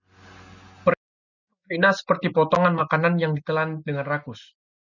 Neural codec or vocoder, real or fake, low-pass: none; real; 7.2 kHz